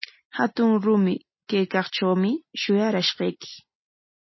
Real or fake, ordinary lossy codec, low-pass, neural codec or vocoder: real; MP3, 24 kbps; 7.2 kHz; none